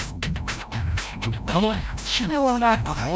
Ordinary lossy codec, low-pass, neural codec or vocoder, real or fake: none; none; codec, 16 kHz, 0.5 kbps, FreqCodec, larger model; fake